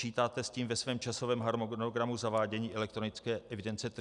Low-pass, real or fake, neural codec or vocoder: 10.8 kHz; real; none